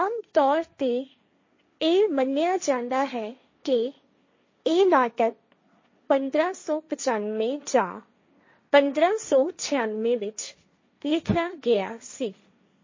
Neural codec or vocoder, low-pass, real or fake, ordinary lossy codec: codec, 16 kHz, 1.1 kbps, Voila-Tokenizer; 7.2 kHz; fake; MP3, 32 kbps